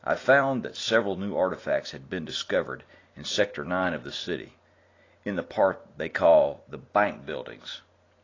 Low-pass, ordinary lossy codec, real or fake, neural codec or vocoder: 7.2 kHz; AAC, 32 kbps; real; none